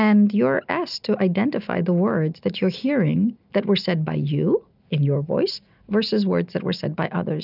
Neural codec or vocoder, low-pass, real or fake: none; 5.4 kHz; real